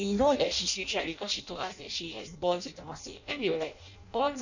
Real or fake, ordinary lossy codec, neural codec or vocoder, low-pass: fake; none; codec, 16 kHz in and 24 kHz out, 0.6 kbps, FireRedTTS-2 codec; 7.2 kHz